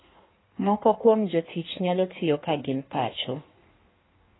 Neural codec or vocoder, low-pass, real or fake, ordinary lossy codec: codec, 16 kHz in and 24 kHz out, 1.1 kbps, FireRedTTS-2 codec; 7.2 kHz; fake; AAC, 16 kbps